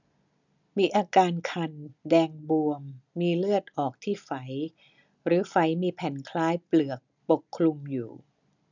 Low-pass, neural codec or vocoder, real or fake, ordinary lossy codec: 7.2 kHz; none; real; none